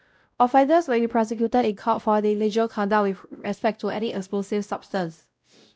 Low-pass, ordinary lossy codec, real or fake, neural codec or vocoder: none; none; fake; codec, 16 kHz, 0.5 kbps, X-Codec, WavLM features, trained on Multilingual LibriSpeech